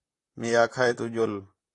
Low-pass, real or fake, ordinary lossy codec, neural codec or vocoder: 10.8 kHz; fake; AAC, 48 kbps; vocoder, 44.1 kHz, 128 mel bands, Pupu-Vocoder